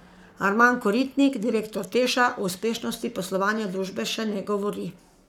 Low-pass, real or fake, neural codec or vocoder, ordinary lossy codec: 19.8 kHz; fake; codec, 44.1 kHz, 7.8 kbps, Pupu-Codec; none